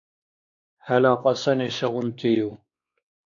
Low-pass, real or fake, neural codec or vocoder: 7.2 kHz; fake; codec, 16 kHz, 2 kbps, X-Codec, WavLM features, trained on Multilingual LibriSpeech